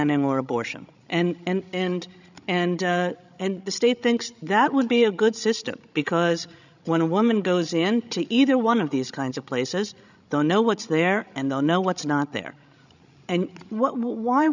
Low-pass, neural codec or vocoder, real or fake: 7.2 kHz; codec, 16 kHz, 16 kbps, FreqCodec, larger model; fake